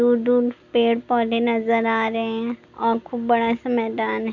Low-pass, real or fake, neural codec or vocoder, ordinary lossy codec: 7.2 kHz; real; none; none